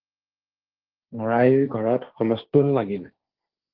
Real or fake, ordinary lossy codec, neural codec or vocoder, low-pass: fake; Opus, 16 kbps; codec, 16 kHz, 1.1 kbps, Voila-Tokenizer; 5.4 kHz